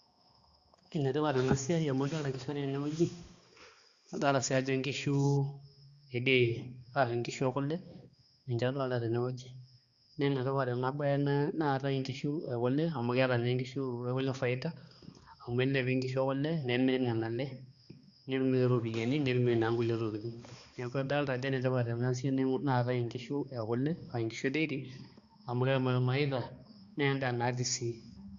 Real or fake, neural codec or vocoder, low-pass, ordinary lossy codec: fake; codec, 16 kHz, 2 kbps, X-Codec, HuBERT features, trained on balanced general audio; 7.2 kHz; Opus, 64 kbps